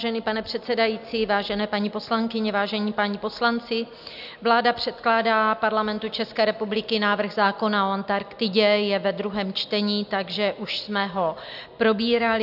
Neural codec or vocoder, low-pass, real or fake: none; 5.4 kHz; real